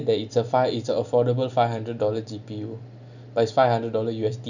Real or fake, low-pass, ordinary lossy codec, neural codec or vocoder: real; 7.2 kHz; none; none